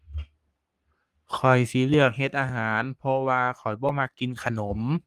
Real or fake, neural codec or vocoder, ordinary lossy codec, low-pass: fake; codec, 44.1 kHz, 3.4 kbps, Pupu-Codec; Opus, 32 kbps; 14.4 kHz